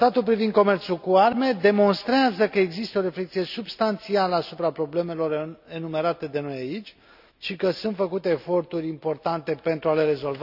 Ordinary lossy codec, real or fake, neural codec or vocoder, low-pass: none; real; none; 5.4 kHz